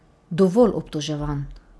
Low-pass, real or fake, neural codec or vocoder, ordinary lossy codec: none; real; none; none